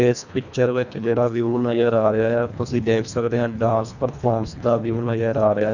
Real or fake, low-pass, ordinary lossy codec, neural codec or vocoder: fake; 7.2 kHz; none; codec, 24 kHz, 1.5 kbps, HILCodec